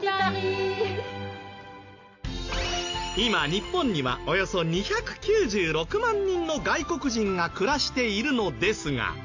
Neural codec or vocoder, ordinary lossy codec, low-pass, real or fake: none; AAC, 48 kbps; 7.2 kHz; real